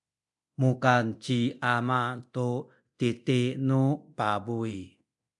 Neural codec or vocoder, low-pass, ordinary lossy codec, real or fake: codec, 24 kHz, 0.9 kbps, DualCodec; 10.8 kHz; MP3, 96 kbps; fake